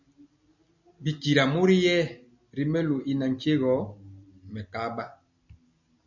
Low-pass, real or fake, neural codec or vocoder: 7.2 kHz; real; none